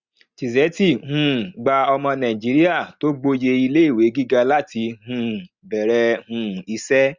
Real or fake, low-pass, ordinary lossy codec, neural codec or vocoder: real; 7.2 kHz; Opus, 64 kbps; none